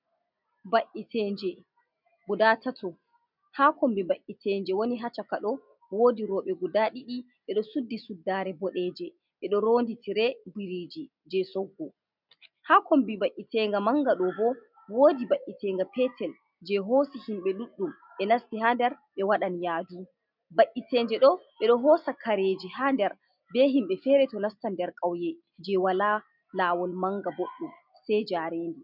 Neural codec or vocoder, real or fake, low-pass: none; real; 5.4 kHz